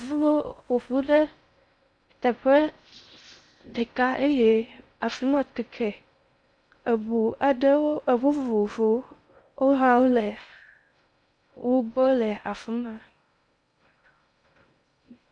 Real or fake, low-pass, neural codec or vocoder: fake; 9.9 kHz; codec, 16 kHz in and 24 kHz out, 0.6 kbps, FocalCodec, streaming, 2048 codes